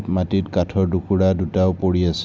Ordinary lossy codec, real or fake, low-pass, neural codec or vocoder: none; real; none; none